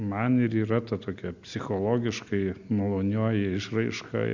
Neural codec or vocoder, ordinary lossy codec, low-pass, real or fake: none; Opus, 64 kbps; 7.2 kHz; real